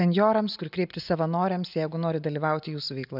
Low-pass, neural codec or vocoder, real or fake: 5.4 kHz; none; real